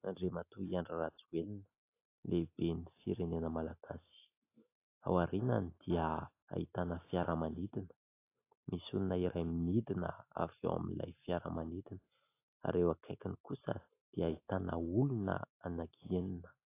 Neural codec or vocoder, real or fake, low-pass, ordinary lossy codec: none; real; 3.6 kHz; AAC, 24 kbps